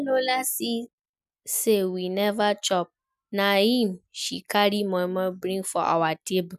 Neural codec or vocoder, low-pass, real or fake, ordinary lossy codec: none; 14.4 kHz; real; none